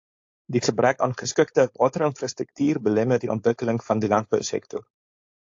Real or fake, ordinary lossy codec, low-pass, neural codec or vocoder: fake; AAC, 48 kbps; 7.2 kHz; codec, 16 kHz, 4.8 kbps, FACodec